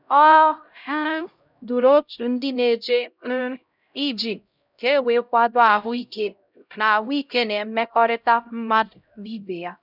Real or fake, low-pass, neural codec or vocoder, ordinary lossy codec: fake; 5.4 kHz; codec, 16 kHz, 0.5 kbps, X-Codec, HuBERT features, trained on LibriSpeech; none